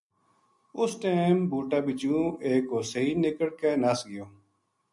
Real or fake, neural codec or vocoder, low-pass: real; none; 10.8 kHz